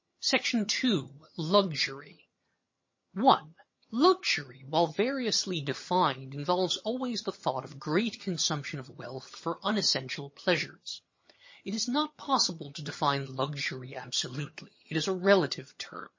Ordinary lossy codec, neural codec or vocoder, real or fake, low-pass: MP3, 32 kbps; vocoder, 22.05 kHz, 80 mel bands, HiFi-GAN; fake; 7.2 kHz